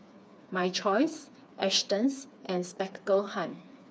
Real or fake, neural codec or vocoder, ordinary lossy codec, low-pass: fake; codec, 16 kHz, 4 kbps, FreqCodec, smaller model; none; none